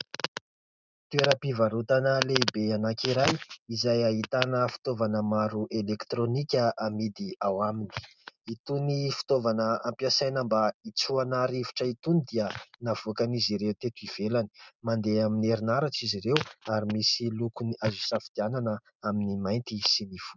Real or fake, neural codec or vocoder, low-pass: real; none; 7.2 kHz